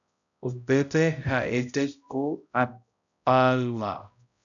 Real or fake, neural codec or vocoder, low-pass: fake; codec, 16 kHz, 0.5 kbps, X-Codec, HuBERT features, trained on balanced general audio; 7.2 kHz